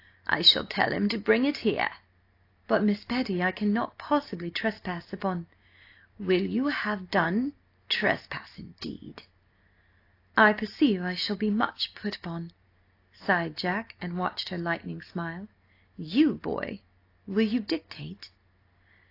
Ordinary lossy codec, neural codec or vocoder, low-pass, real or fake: AAC, 32 kbps; none; 5.4 kHz; real